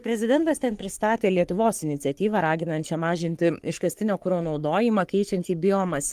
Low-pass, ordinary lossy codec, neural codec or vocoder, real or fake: 14.4 kHz; Opus, 32 kbps; codec, 44.1 kHz, 3.4 kbps, Pupu-Codec; fake